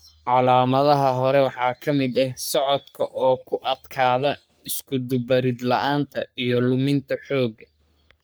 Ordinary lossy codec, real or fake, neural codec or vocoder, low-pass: none; fake; codec, 44.1 kHz, 3.4 kbps, Pupu-Codec; none